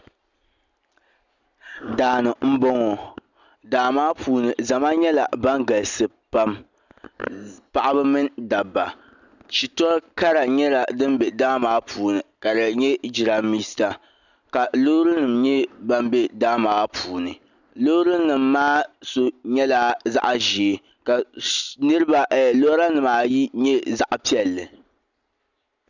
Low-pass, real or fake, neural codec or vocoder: 7.2 kHz; real; none